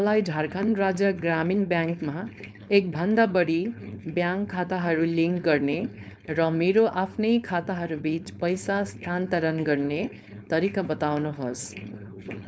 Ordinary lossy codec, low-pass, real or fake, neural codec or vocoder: none; none; fake; codec, 16 kHz, 4.8 kbps, FACodec